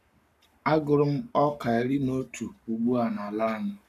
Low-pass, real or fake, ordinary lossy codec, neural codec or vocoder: 14.4 kHz; fake; none; codec, 44.1 kHz, 7.8 kbps, Pupu-Codec